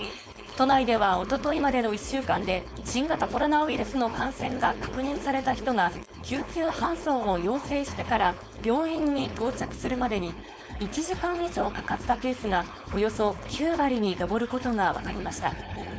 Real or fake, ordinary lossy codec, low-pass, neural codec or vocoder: fake; none; none; codec, 16 kHz, 4.8 kbps, FACodec